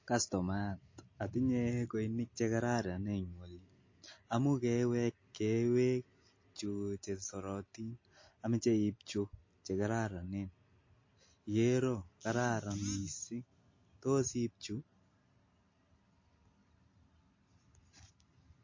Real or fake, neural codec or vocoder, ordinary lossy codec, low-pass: real; none; MP3, 32 kbps; 7.2 kHz